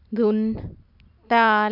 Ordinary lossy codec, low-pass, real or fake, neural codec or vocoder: none; 5.4 kHz; real; none